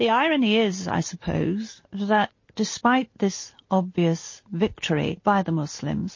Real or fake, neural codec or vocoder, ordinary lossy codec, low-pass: real; none; MP3, 32 kbps; 7.2 kHz